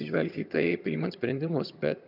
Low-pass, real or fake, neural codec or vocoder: 5.4 kHz; fake; vocoder, 22.05 kHz, 80 mel bands, HiFi-GAN